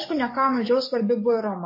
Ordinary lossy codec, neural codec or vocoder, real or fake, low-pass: MP3, 24 kbps; codec, 44.1 kHz, 7.8 kbps, DAC; fake; 5.4 kHz